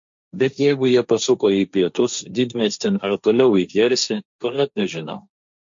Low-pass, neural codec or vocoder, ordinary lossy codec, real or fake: 7.2 kHz; codec, 16 kHz, 1.1 kbps, Voila-Tokenizer; MP3, 48 kbps; fake